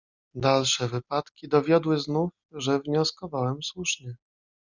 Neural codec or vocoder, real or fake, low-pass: none; real; 7.2 kHz